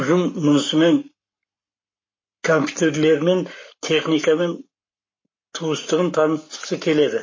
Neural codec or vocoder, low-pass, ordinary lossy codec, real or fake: codec, 44.1 kHz, 7.8 kbps, Pupu-Codec; 7.2 kHz; MP3, 32 kbps; fake